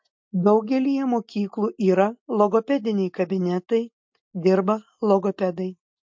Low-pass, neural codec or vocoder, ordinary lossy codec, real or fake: 7.2 kHz; none; MP3, 48 kbps; real